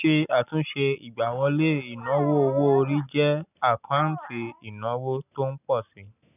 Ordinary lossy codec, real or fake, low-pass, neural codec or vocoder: none; real; 3.6 kHz; none